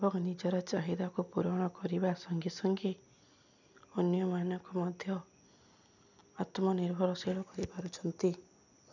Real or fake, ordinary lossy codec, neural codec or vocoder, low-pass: real; none; none; 7.2 kHz